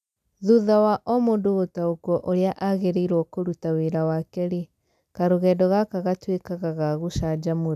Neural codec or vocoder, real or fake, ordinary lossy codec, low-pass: none; real; none; 14.4 kHz